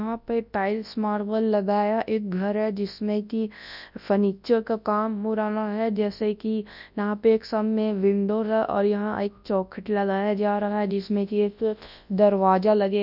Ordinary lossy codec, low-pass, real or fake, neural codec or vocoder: none; 5.4 kHz; fake; codec, 24 kHz, 0.9 kbps, WavTokenizer, large speech release